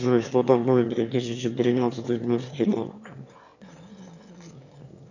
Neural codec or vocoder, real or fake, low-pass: autoencoder, 22.05 kHz, a latent of 192 numbers a frame, VITS, trained on one speaker; fake; 7.2 kHz